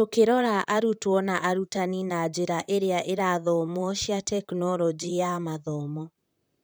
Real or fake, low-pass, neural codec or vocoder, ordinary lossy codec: fake; none; vocoder, 44.1 kHz, 128 mel bands, Pupu-Vocoder; none